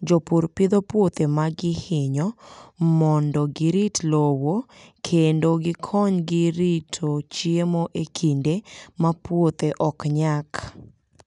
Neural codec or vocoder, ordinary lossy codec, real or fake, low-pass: none; none; real; 10.8 kHz